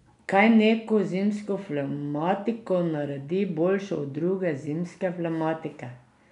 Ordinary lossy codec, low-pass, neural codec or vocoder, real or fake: none; 10.8 kHz; none; real